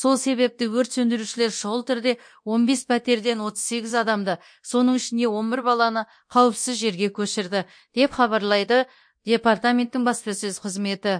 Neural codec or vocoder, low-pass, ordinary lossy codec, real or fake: codec, 24 kHz, 0.9 kbps, DualCodec; 9.9 kHz; MP3, 48 kbps; fake